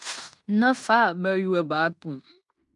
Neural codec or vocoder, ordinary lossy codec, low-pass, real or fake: codec, 16 kHz in and 24 kHz out, 0.9 kbps, LongCat-Audio-Codec, fine tuned four codebook decoder; MP3, 96 kbps; 10.8 kHz; fake